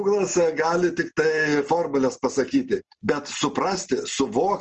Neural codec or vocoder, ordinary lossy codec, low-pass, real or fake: none; Opus, 24 kbps; 7.2 kHz; real